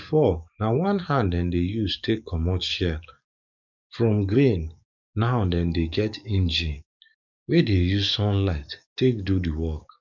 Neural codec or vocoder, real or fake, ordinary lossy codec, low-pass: codec, 44.1 kHz, 7.8 kbps, DAC; fake; none; 7.2 kHz